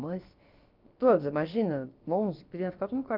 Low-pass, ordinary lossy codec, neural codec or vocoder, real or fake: 5.4 kHz; Opus, 32 kbps; codec, 16 kHz, about 1 kbps, DyCAST, with the encoder's durations; fake